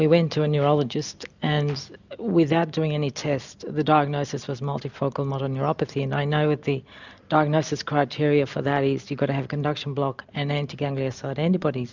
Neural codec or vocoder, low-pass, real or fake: none; 7.2 kHz; real